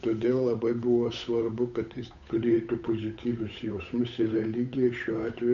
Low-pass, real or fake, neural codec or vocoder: 7.2 kHz; fake; codec, 16 kHz, 8 kbps, FunCodec, trained on Chinese and English, 25 frames a second